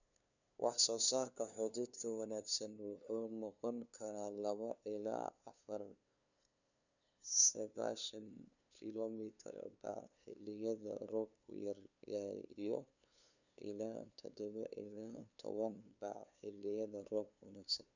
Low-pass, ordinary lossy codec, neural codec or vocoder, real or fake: 7.2 kHz; none; codec, 16 kHz, 2 kbps, FunCodec, trained on LibriTTS, 25 frames a second; fake